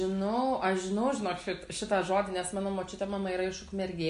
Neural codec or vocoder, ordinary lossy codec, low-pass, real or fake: none; MP3, 48 kbps; 10.8 kHz; real